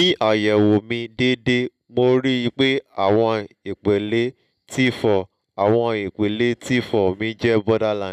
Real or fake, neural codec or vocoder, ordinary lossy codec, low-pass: real; none; none; 14.4 kHz